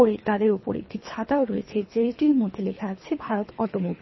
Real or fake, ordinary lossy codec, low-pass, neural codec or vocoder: fake; MP3, 24 kbps; 7.2 kHz; codec, 24 kHz, 3 kbps, HILCodec